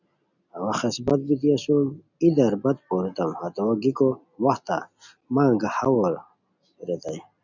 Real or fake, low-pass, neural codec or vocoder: real; 7.2 kHz; none